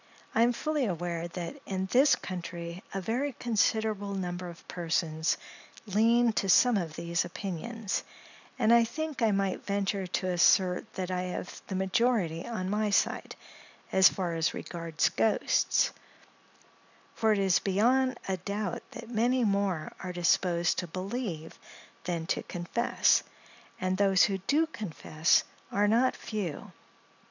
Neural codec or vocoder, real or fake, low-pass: none; real; 7.2 kHz